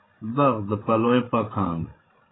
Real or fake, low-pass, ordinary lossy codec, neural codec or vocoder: fake; 7.2 kHz; AAC, 16 kbps; codec, 16 kHz, 8 kbps, FreqCodec, larger model